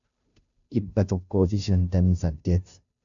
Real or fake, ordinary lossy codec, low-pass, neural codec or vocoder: fake; AAC, 64 kbps; 7.2 kHz; codec, 16 kHz, 0.5 kbps, FunCodec, trained on Chinese and English, 25 frames a second